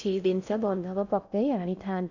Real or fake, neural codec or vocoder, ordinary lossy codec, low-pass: fake; codec, 16 kHz in and 24 kHz out, 0.6 kbps, FocalCodec, streaming, 4096 codes; none; 7.2 kHz